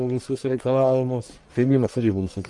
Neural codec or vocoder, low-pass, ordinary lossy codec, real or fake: codec, 44.1 kHz, 1.7 kbps, Pupu-Codec; 10.8 kHz; Opus, 32 kbps; fake